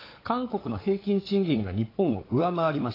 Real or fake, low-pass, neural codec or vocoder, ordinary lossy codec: fake; 5.4 kHz; codec, 16 kHz, 8 kbps, FunCodec, trained on LibriTTS, 25 frames a second; AAC, 24 kbps